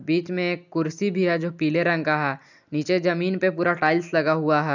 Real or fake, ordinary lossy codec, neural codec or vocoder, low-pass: real; none; none; 7.2 kHz